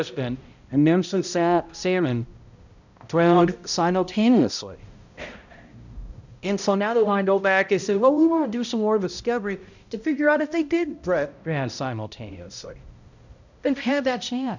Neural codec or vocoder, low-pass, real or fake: codec, 16 kHz, 0.5 kbps, X-Codec, HuBERT features, trained on balanced general audio; 7.2 kHz; fake